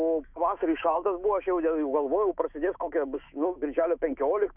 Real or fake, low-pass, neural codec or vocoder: fake; 3.6 kHz; autoencoder, 48 kHz, 128 numbers a frame, DAC-VAE, trained on Japanese speech